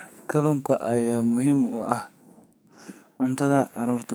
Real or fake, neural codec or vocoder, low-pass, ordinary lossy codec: fake; codec, 44.1 kHz, 2.6 kbps, SNAC; none; none